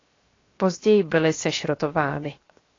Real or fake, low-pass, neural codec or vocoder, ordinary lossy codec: fake; 7.2 kHz; codec, 16 kHz, 0.7 kbps, FocalCodec; AAC, 32 kbps